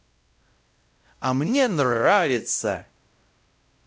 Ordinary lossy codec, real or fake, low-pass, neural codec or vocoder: none; fake; none; codec, 16 kHz, 0.5 kbps, X-Codec, WavLM features, trained on Multilingual LibriSpeech